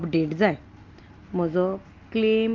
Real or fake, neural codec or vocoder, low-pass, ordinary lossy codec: real; none; 7.2 kHz; Opus, 24 kbps